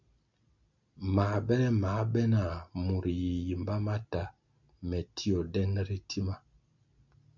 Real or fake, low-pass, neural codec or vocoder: real; 7.2 kHz; none